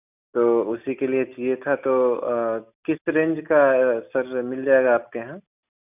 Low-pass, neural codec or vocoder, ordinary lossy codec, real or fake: 3.6 kHz; none; none; real